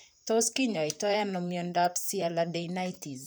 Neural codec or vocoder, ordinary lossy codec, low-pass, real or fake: vocoder, 44.1 kHz, 128 mel bands, Pupu-Vocoder; none; none; fake